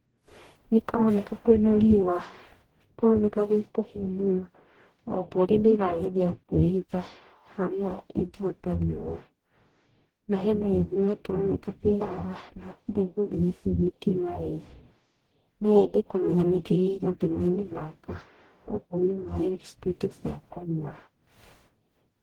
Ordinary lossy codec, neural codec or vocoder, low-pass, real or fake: Opus, 16 kbps; codec, 44.1 kHz, 0.9 kbps, DAC; 19.8 kHz; fake